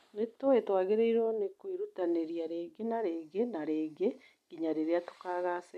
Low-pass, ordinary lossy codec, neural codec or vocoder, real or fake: 14.4 kHz; none; none; real